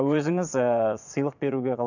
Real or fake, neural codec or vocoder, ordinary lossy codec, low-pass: fake; vocoder, 22.05 kHz, 80 mel bands, Vocos; none; 7.2 kHz